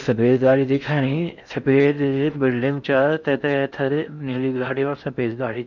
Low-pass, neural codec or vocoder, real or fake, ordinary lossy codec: 7.2 kHz; codec, 16 kHz in and 24 kHz out, 0.6 kbps, FocalCodec, streaming, 4096 codes; fake; none